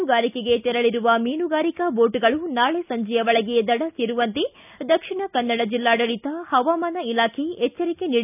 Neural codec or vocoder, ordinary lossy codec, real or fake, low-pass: none; none; real; 3.6 kHz